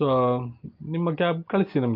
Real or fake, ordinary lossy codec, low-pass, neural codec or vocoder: real; Opus, 32 kbps; 5.4 kHz; none